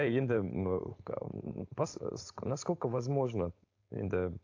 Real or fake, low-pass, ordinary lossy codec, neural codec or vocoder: fake; 7.2 kHz; AAC, 48 kbps; codec, 16 kHz, 4.8 kbps, FACodec